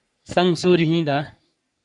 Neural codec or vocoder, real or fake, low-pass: codec, 44.1 kHz, 3.4 kbps, Pupu-Codec; fake; 10.8 kHz